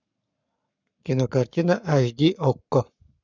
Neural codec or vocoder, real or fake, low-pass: codec, 44.1 kHz, 7.8 kbps, Pupu-Codec; fake; 7.2 kHz